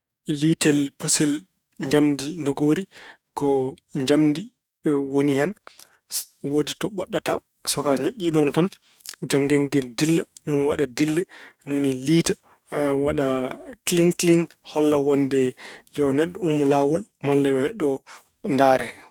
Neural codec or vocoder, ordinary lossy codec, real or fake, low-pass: codec, 44.1 kHz, 2.6 kbps, DAC; none; fake; 19.8 kHz